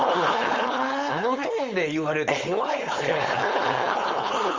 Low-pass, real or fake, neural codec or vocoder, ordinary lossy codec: 7.2 kHz; fake; codec, 16 kHz, 4.8 kbps, FACodec; Opus, 32 kbps